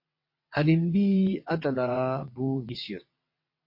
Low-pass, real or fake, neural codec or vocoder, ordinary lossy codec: 5.4 kHz; fake; vocoder, 22.05 kHz, 80 mel bands, WaveNeXt; MP3, 32 kbps